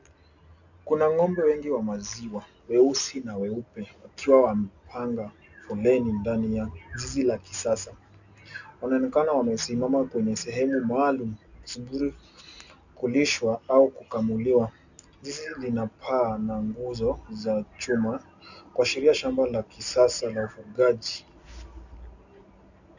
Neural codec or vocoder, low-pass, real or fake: none; 7.2 kHz; real